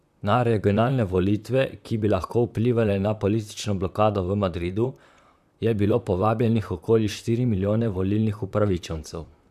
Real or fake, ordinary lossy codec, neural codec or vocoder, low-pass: fake; none; vocoder, 44.1 kHz, 128 mel bands, Pupu-Vocoder; 14.4 kHz